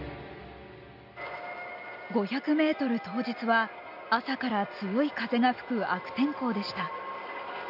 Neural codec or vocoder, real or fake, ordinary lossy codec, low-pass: none; real; none; 5.4 kHz